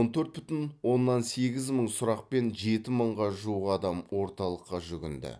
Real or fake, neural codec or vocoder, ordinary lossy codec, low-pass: real; none; none; none